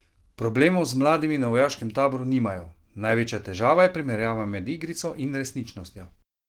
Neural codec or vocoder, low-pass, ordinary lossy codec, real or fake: autoencoder, 48 kHz, 128 numbers a frame, DAC-VAE, trained on Japanese speech; 19.8 kHz; Opus, 16 kbps; fake